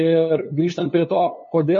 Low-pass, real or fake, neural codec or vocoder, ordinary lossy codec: 7.2 kHz; fake; codec, 16 kHz, 2 kbps, FunCodec, trained on LibriTTS, 25 frames a second; MP3, 32 kbps